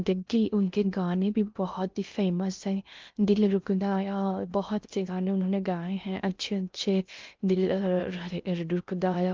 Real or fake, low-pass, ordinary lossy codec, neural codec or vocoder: fake; 7.2 kHz; Opus, 24 kbps; codec, 16 kHz in and 24 kHz out, 0.6 kbps, FocalCodec, streaming, 2048 codes